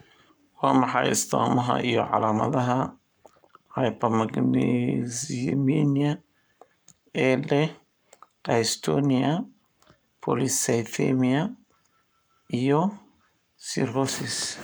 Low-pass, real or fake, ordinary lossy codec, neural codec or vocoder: none; fake; none; codec, 44.1 kHz, 7.8 kbps, Pupu-Codec